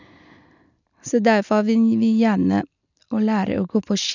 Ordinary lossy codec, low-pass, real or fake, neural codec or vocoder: none; 7.2 kHz; real; none